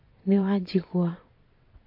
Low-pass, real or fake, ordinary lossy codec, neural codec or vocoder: 5.4 kHz; fake; MP3, 32 kbps; vocoder, 22.05 kHz, 80 mel bands, WaveNeXt